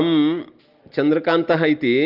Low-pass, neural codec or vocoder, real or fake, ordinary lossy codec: 5.4 kHz; none; real; Opus, 32 kbps